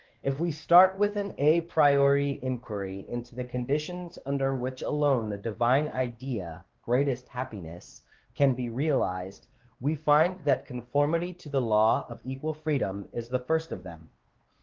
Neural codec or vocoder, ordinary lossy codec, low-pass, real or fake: codec, 16 kHz, 2 kbps, X-Codec, WavLM features, trained on Multilingual LibriSpeech; Opus, 16 kbps; 7.2 kHz; fake